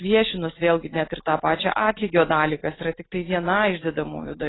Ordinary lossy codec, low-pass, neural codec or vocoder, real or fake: AAC, 16 kbps; 7.2 kHz; none; real